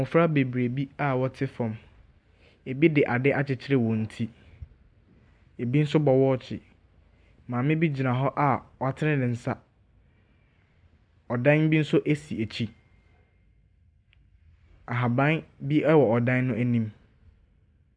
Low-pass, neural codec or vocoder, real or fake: 9.9 kHz; none; real